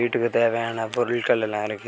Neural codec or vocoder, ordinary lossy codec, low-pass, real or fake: none; none; none; real